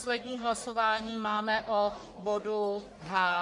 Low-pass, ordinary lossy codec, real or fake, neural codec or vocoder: 10.8 kHz; MP3, 64 kbps; fake; codec, 44.1 kHz, 1.7 kbps, Pupu-Codec